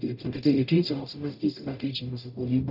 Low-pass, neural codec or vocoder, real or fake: 5.4 kHz; codec, 44.1 kHz, 0.9 kbps, DAC; fake